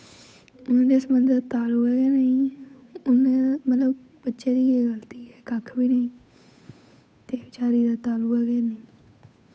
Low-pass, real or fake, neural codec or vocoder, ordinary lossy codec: none; fake; codec, 16 kHz, 8 kbps, FunCodec, trained on Chinese and English, 25 frames a second; none